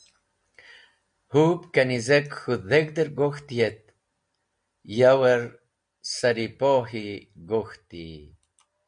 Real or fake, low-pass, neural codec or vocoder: real; 9.9 kHz; none